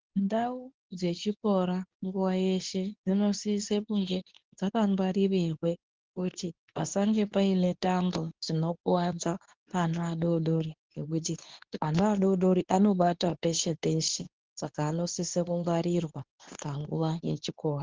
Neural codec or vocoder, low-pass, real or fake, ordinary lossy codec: codec, 24 kHz, 0.9 kbps, WavTokenizer, medium speech release version 2; 7.2 kHz; fake; Opus, 16 kbps